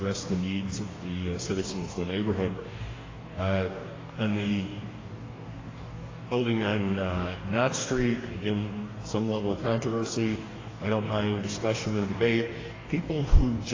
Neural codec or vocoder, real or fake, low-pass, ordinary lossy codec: codec, 44.1 kHz, 2.6 kbps, DAC; fake; 7.2 kHz; AAC, 32 kbps